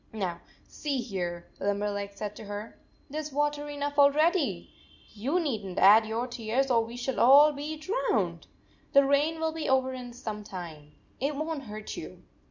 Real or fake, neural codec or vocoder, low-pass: real; none; 7.2 kHz